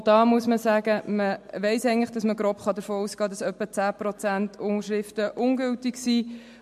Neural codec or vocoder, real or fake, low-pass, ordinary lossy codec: none; real; 14.4 kHz; MP3, 64 kbps